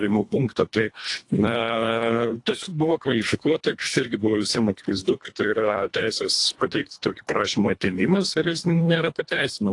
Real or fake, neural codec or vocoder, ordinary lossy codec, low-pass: fake; codec, 24 kHz, 1.5 kbps, HILCodec; AAC, 48 kbps; 10.8 kHz